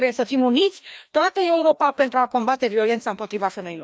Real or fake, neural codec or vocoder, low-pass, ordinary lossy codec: fake; codec, 16 kHz, 1 kbps, FreqCodec, larger model; none; none